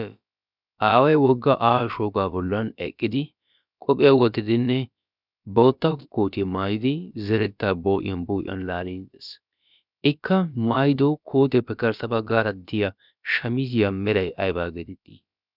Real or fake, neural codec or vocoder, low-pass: fake; codec, 16 kHz, about 1 kbps, DyCAST, with the encoder's durations; 5.4 kHz